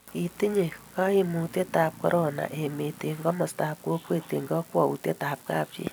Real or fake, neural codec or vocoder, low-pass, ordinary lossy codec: fake; vocoder, 44.1 kHz, 128 mel bands every 256 samples, BigVGAN v2; none; none